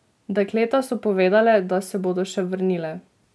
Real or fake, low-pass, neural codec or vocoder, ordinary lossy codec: real; none; none; none